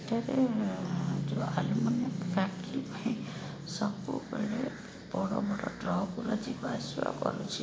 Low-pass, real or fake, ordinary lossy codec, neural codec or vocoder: none; real; none; none